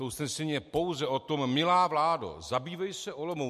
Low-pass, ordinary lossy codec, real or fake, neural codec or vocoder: 14.4 kHz; MP3, 64 kbps; real; none